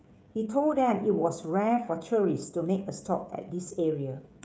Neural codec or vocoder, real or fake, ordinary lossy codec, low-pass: codec, 16 kHz, 16 kbps, FreqCodec, smaller model; fake; none; none